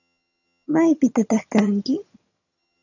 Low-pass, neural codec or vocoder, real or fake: 7.2 kHz; vocoder, 22.05 kHz, 80 mel bands, HiFi-GAN; fake